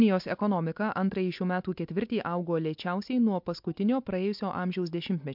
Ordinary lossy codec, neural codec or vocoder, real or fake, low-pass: MP3, 48 kbps; none; real; 5.4 kHz